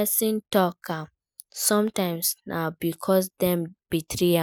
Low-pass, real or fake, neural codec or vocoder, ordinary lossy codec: none; real; none; none